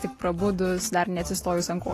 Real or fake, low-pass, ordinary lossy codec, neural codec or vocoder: real; 14.4 kHz; AAC, 48 kbps; none